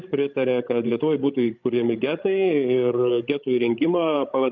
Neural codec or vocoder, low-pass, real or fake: codec, 16 kHz, 16 kbps, FreqCodec, larger model; 7.2 kHz; fake